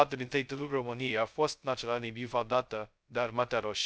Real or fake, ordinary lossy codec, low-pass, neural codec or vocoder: fake; none; none; codec, 16 kHz, 0.2 kbps, FocalCodec